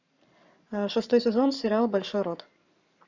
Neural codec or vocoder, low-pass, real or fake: codec, 44.1 kHz, 7.8 kbps, Pupu-Codec; 7.2 kHz; fake